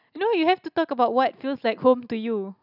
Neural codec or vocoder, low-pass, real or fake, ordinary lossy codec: none; 5.4 kHz; real; none